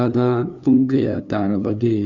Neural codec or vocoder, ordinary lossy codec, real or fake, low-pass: codec, 16 kHz, 2 kbps, FunCodec, trained on LibriTTS, 25 frames a second; none; fake; 7.2 kHz